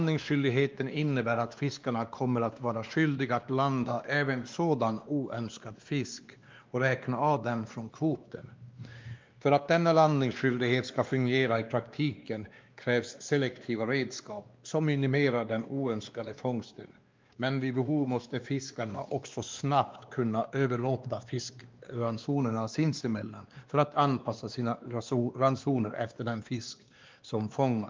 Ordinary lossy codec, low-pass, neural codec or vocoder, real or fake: Opus, 24 kbps; 7.2 kHz; codec, 16 kHz, 2 kbps, X-Codec, WavLM features, trained on Multilingual LibriSpeech; fake